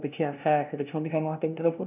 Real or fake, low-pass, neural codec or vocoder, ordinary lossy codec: fake; 3.6 kHz; codec, 16 kHz, 0.5 kbps, FunCodec, trained on LibriTTS, 25 frames a second; none